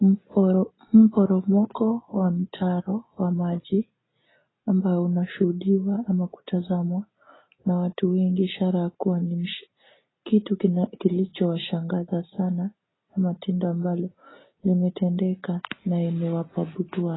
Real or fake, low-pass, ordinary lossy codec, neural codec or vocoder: real; 7.2 kHz; AAC, 16 kbps; none